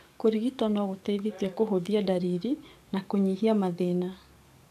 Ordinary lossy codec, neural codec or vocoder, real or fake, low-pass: AAC, 96 kbps; codec, 44.1 kHz, 7.8 kbps, DAC; fake; 14.4 kHz